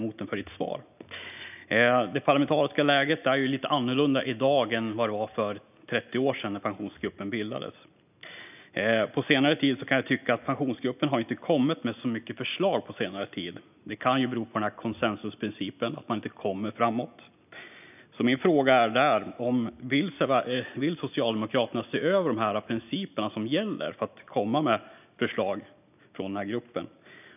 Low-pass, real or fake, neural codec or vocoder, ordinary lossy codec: 3.6 kHz; real; none; none